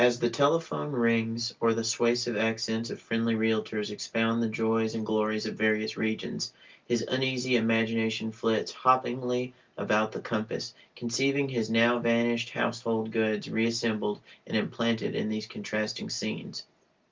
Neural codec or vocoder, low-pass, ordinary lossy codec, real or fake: none; 7.2 kHz; Opus, 24 kbps; real